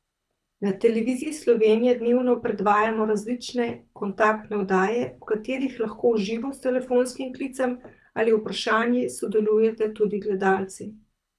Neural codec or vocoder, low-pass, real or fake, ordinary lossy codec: codec, 24 kHz, 6 kbps, HILCodec; none; fake; none